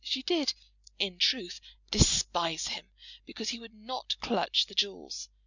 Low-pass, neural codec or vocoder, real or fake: 7.2 kHz; none; real